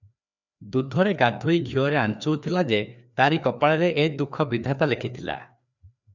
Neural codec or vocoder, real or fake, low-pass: codec, 16 kHz, 2 kbps, FreqCodec, larger model; fake; 7.2 kHz